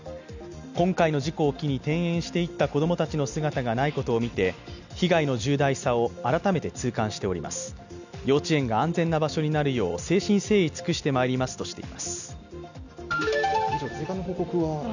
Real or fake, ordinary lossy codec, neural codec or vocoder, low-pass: real; none; none; 7.2 kHz